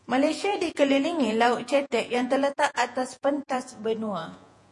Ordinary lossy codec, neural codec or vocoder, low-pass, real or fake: MP3, 48 kbps; vocoder, 48 kHz, 128 mel bands, Vocos; 10.8 kHz; fake